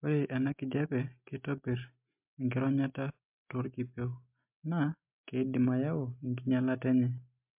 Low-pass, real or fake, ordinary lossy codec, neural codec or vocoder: 3.6 kHz; real; MP3, 32 kbps; none